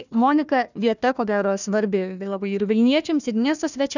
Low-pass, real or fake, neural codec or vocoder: 7.2 kHz; fake; codec, 16 kHz, 1 kbps, FunCodec, trained on Chinese and English, 50 frames a second